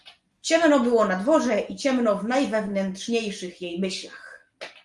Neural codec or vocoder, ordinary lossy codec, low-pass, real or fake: none; Opus, 32 kbps; 10.8 kHz; real